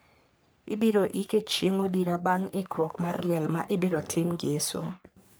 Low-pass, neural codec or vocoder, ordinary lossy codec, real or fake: none; codec, 44.1 kHz, 3.4 kbps, Pupu-Codec; none; fake